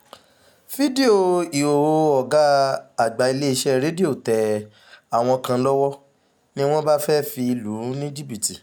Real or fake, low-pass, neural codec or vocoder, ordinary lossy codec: real; none; none; none